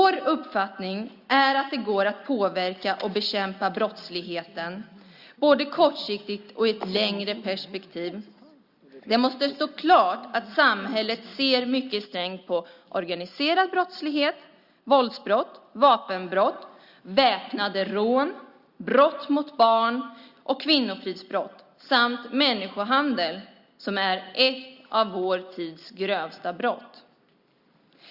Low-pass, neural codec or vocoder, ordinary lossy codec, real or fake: 5.4 kHz; none; Opus, 64 kbps; real